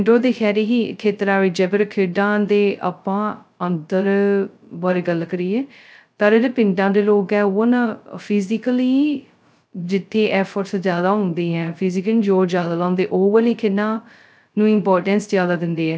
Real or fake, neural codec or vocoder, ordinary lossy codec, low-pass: fake; codec, 16 kHz, 0.2 kbps, FocalCodec; none; none